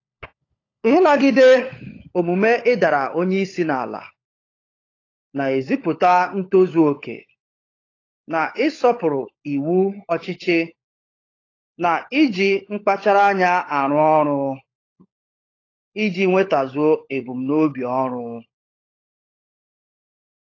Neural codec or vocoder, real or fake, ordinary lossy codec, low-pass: codec, 16 kHz, 16 kbps, FunCodec, trained on LibriTTS, 50 frames a second; fake; AAC, 32 kbps; 7.2 kHz